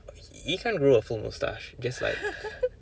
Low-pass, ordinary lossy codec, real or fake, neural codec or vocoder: none; none; real; none